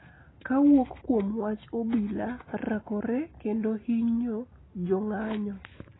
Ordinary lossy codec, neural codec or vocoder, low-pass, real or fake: AAC, 16 kbps; none; 7.2 kHz; real